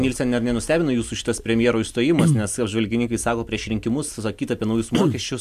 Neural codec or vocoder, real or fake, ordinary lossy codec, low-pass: none; real; MP3, 96 kbps; 14.4 kHz